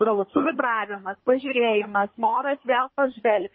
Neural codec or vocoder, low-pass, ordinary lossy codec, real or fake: codec, 24 kHz, 1 kbps, SNAC; 7.2 kHz; MP3, 24 kbps; fake